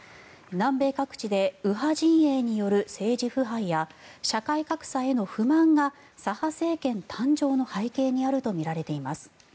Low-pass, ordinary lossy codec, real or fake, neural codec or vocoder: none; none; real; none